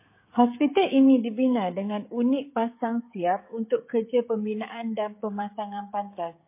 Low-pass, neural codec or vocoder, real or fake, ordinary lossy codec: 3.6 kHz; codec, 16 kHz, 16 kbps, FreqCodec, smaller model; fake; MP3, 24 kbps